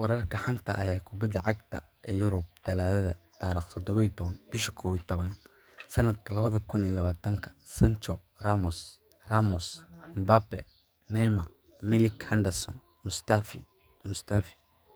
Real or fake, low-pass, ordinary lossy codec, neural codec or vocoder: fake; none; none; codec, 44.1 kHz, 2.6 kbps, SNAC